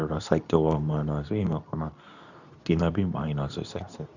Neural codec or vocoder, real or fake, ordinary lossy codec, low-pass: codec, 24 kHz, 0.9 kbps, WavTokenizer, medium speech release version 2; fake; none; 7.2 kHz